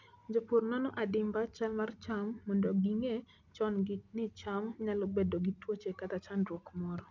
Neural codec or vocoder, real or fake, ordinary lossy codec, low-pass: none; real; none; 7.2 kHz